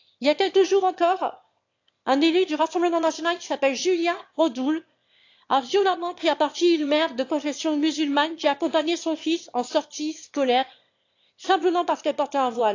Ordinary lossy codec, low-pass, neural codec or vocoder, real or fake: AAC, 48 kbps; 7.2 kHz; autoencoder, 22.05 kHz, a latent of 192 numbers a frame, VITS, trained on one speaker; fake